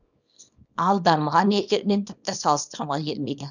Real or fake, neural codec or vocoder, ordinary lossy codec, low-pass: fake; codec, 24 kHz, 0.9 kbps, WavTokenizer, small release; none; 7.2 kHz